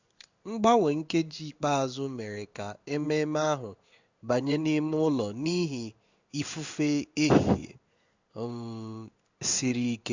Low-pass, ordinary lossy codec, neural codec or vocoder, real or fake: 7.2 kHz; Opus, 64 kbps; codec, 16 kHz in and 24 kHz out, 1 kbps, XY-Tokenizer; fake